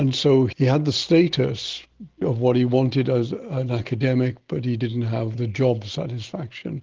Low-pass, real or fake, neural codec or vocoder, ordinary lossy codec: 7.2 kHz; real; none; Opus, 16 kbps